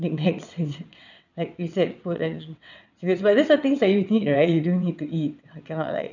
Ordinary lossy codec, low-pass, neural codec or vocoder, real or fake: none; 7.2 kHz; vocoder, 22.05 kHz, 80 mel bands, WaveNeXt; fake